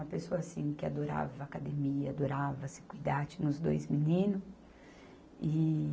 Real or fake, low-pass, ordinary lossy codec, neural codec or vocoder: real; none; none; none